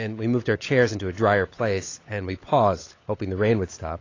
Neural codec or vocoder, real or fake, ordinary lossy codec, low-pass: codec, 16 kHz, 16 kbps, FunCodec, trained on LibriTTS, 50 frames a second; fake; AAC, 32 kbps; 7.2 kHz